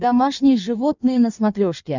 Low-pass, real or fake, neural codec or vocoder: 7.2 kHz; fake; codec, 16 kHz in and 24 kHz out, 1.1 kbps, FireRedTTS-2 codec